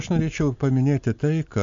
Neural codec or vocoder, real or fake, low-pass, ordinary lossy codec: none; real; 7.2 kHz; AAC, 64 kbps